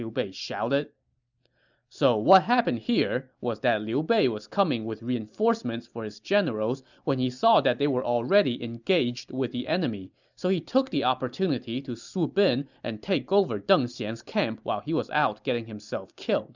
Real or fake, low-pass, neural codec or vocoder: real; 7.2 kHz; none